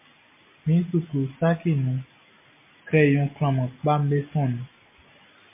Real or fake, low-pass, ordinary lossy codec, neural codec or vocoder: real; 3.6 kHz; MP3, 24 kbps; none